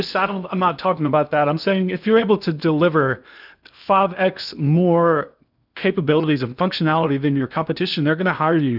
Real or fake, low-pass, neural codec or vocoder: fake; 5.4 kHz; codec, 16 kHz in and 24 kHz out, 0.8 kbps, FocalCodec, streaming, 65536 codes